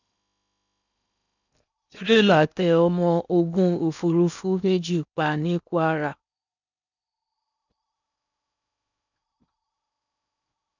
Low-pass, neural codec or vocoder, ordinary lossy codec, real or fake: 7.2 kHz; codec, 16 kHz in and 24 kHz out, 0.8 kbps, FocalCodec, streaming, 65536 codes; none; fake